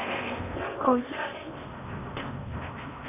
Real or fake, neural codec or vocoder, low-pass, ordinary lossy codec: fake; codec, 16 kHz in and 24 kHz out, 0.8 kbps, FocalCodec, streaming, 65536 codes; 3.6 kHz; none